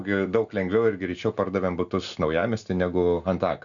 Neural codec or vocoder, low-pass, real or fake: none; 7.2 kHz; real